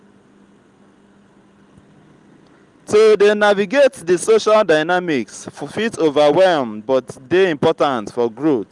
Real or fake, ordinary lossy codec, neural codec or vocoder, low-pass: real; Opus, 32 kbps; none; 10.8 kHz